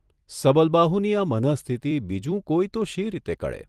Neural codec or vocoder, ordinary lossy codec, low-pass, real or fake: vocoder, 44.1 kHz, 128 mel bands every 256 samples, BigVGAN v2; Opus, 24 kbps; 14.4 kHz; fake